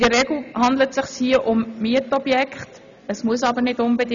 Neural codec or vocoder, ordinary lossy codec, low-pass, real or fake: none; none; 7.2 kHz; real